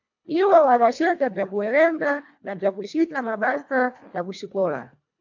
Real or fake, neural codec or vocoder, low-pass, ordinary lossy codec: fake; codec, 24 kHz, 1.5 kbps, HILCodec; 7.2 kHz; MP3, 64 kbps